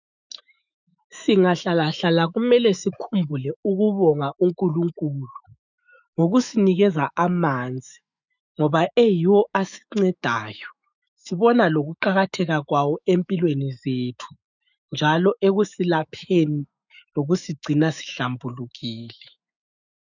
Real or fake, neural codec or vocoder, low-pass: fake; autoencoder, 48 kHz, 128 numbers a frame, DAC-VAE, trained on Japanese speech; 7.2 kHz